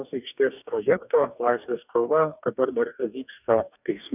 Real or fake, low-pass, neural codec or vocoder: fake; 3.6 kHz; codec, 44.1 kHz, 2.6 kbps, DAC